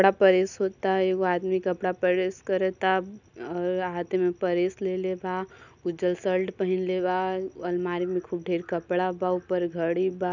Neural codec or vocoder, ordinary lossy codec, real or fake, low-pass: none; none; real; 7.2 kHz